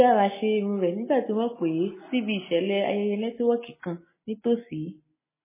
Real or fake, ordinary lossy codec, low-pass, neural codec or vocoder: real; MP3, 16 kbps; 3.6 kHz; none